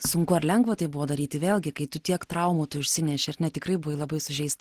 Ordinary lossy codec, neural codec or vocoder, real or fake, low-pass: Opus, 16 kbps; none; real; 14.4 kHz